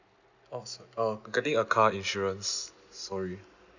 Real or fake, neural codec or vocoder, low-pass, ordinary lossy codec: real; none; 7.2 kHz; none